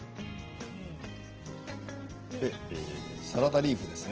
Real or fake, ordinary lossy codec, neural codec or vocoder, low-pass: real; Opus, 16 kbps; none; 7.2 kHz